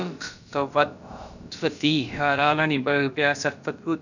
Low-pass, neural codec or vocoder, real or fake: 7.2 kHz; codec, 16 kHz, about 1 kbps, DyCAST, with the encoder's durations; fake